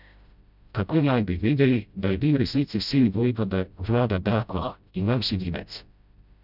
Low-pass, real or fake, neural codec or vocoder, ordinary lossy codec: 5.4 kHz; fake; codec, 16 kHz, 0.5 kbps, FreqCodec, smaller model; none